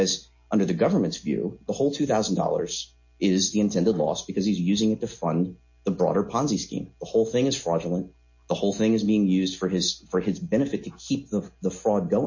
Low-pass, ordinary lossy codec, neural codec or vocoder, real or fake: 7.2 kHz; MP3, 32 kbps; none; real